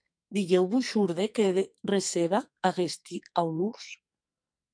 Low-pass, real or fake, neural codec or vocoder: 9.9 kHz; fake; codec, 44.1 kHz, 2.6 kbps, SNAC